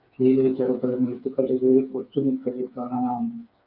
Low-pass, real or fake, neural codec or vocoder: 5.4 kHz; fake; codec, 16 kHz, 4 kbps, FreqCodec, smaller model